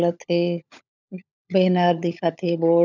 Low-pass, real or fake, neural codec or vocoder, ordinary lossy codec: 7.2 kHz; fake; codec, 16 kHz, 16 kbps, FunCodec, trained on LibriTTS, 50 frames a second; none